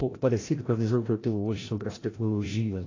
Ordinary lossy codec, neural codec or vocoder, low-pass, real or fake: AAC, 32 kbps; codec, 16 kHz, 0.5 kbps, FreqCodec, larger model; 7.2 kHz; fake